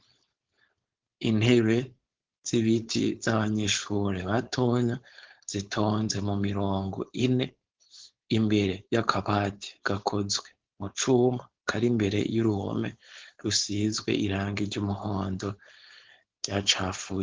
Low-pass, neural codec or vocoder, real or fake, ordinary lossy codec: 7.2 kHz; codec, 16 kHz, 4.8 kbps, FACodec; fake; Opus, 16 kbps